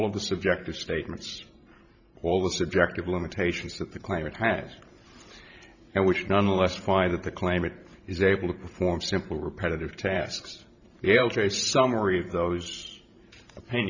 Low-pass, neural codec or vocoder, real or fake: 7.2 kHz; vocoder, 44.1 kHz, 128 mel bands every 512 samples, BigVGAN v2; fake